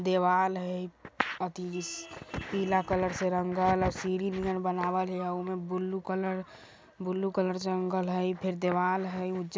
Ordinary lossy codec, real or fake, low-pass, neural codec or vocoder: none; real; none; none